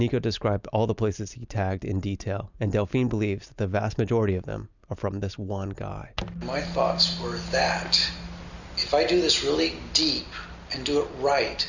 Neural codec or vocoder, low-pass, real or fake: none; 7.2 kHz; real